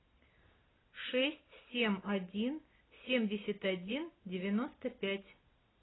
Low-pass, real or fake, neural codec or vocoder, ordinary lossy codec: 7.2 kHz; real; none; AAC, 16 kbps